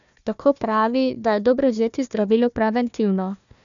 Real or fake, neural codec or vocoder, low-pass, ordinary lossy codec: fake; codec, 16 kHz, 1 kbps, FunCodec, trained on Chinese and English, 50 frames a second; 7.2 kHz; none